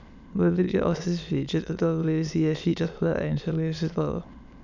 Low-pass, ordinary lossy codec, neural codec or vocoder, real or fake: 7.2 kHz; none; autoencoder, 22.05 kHz, a latent of 192 numbers a frame, VITS, trained on many speakers; fake